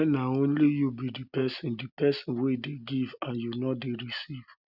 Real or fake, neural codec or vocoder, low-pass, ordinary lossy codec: real; none; 5.4 kHz; none